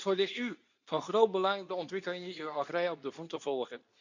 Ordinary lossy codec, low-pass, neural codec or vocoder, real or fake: none; 7.2 kHz; codec, 24 kHz, 0.9 kbps, WavTokenizer, medium speech release version 2; fake